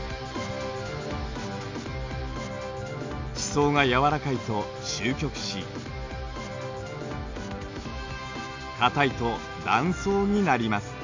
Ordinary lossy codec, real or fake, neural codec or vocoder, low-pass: MP3, 64 kbps; real; none; 7.2 kHz